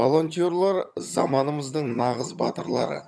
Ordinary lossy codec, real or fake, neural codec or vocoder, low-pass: none; fake; vocoder, 22.05 kHz, 80 mel bands, HiFi-GAN; none